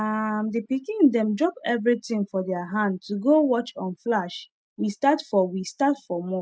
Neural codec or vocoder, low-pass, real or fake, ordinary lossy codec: none; none; real; none